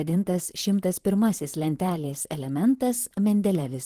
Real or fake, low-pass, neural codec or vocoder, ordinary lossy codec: fake; 14.4 kHz; vocoder, 44.1 kHz, 128 mel bands, Pupu-Vocoder; Opus, 16 kbps